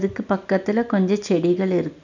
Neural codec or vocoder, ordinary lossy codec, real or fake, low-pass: none; none; real; 7.2 kHz